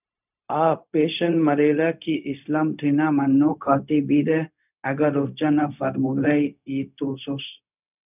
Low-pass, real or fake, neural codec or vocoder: 3.6 kHz; fake; codec, 16 kHz, 0.4 kbps, LongCat-Audio-Codec